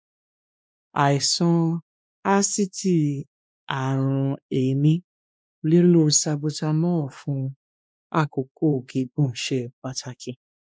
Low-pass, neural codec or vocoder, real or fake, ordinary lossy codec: none; codec, 16 kHz, 2 kbps, X-Codec, WavLM features, trained on Multilingual LibriSpeech; fake; none